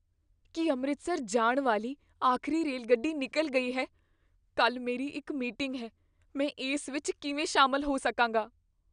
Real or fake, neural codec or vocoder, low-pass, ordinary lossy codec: real; none; 9.9 kHz; none